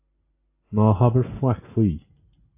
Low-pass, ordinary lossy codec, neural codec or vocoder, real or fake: 3.6 kHz; AAC, 24 kbps; none; real